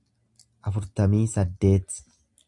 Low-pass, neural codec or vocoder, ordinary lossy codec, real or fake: 10.8 kHz; none; AAC, 64 kbps; real